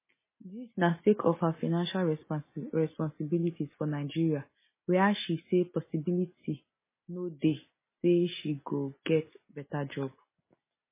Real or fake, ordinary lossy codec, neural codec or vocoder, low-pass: real; MP3, 16 kbps; none; 3.6 kHz